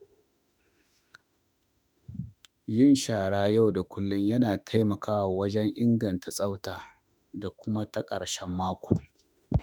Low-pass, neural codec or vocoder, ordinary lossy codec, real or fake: none; autoencoder, 48 kHz, 32 numbers a frame, DAC-VAE, trained on Japanese speech; none; fake